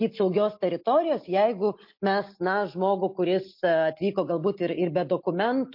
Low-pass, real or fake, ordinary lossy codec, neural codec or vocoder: 5.4 kHz; real; MP3, 32 kbps; none